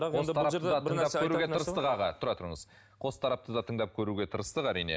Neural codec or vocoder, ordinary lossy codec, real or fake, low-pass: none; none; real; none